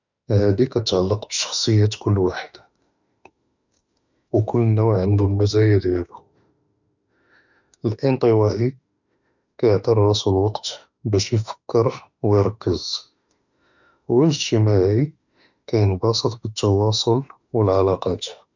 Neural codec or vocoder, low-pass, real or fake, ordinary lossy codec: autoencoder, 48 kHz, 32 numbers a frame, DAC-VAE, trained on Japanese speech; 7.2 kHz; fake; none